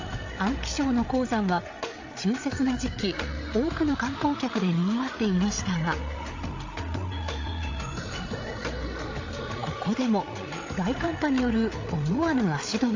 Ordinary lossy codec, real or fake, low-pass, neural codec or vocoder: AAC, 48 kbps; fake; 7.2 kHz; codec, 16 kHz, 8 kbps, FreqCodec, larger model